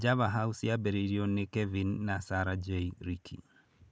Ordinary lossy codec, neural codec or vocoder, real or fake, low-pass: none; none; real; none